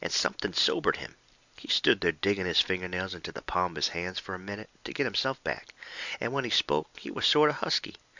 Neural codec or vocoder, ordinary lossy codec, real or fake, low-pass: none; Opus, 64 kbps; real; 7.2 kHz